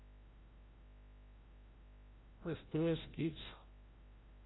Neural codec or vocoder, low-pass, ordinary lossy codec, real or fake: codec, 16 kHz, 0.5 kbps, FreqCodec, larger model; 7.2 kHz; AAC, 16 kbps; fake